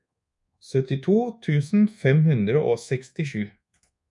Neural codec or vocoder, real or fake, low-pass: codec, 24 kHz, 1.2 kbps, DualCodec; fake; 10.8 kHz